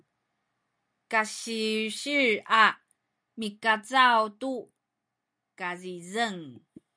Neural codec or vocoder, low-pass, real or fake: none; 9.9 kHz; real